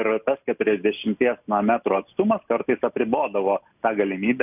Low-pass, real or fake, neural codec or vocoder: 3.6 kHz; real; none